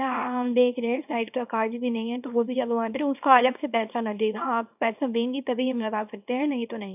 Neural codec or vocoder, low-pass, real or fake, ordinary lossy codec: codec, 24 kHz, 0.9 kbps, WavTokenizer, small release; 3.6 kHz; fake; none